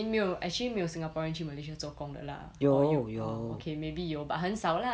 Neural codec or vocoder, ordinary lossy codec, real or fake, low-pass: none; none; real; none